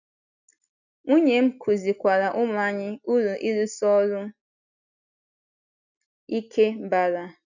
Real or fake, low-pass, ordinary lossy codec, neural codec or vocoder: real; 7.2 kHz; none; none